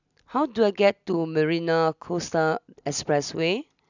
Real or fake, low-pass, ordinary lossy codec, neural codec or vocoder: real; 7.2 kHz; none; none